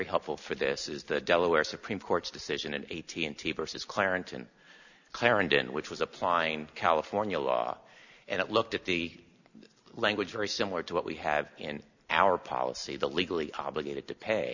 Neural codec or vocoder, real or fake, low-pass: none; real; 7.2 kHz